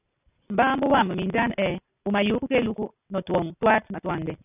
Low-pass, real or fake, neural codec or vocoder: 3.6 kHz; real; none